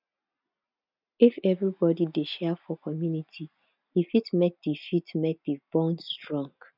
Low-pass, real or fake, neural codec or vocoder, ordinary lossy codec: 5.4 kHz; real; none; none